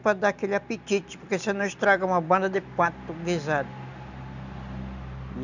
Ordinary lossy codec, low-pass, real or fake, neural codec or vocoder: none; 7.2 kHz; real; none